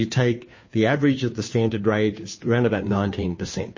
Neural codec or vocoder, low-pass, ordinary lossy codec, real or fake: codec, 16 kHz, 2 kbps, FunCodec, trained on Chinese and English, 25 frames a second; 7.2 kHz; MP3, 32 kbps; fake